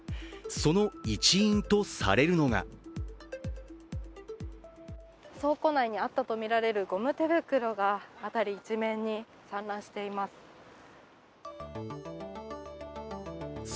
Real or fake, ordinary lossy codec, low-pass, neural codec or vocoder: real; none; none; none